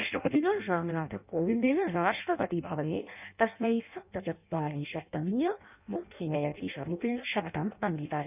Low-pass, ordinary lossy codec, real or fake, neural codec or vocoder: 3.6 kHz; none; fake; codec, 16 kHz in and 24 kHz out, 0.6 kbps, FireRedTTS-2 codec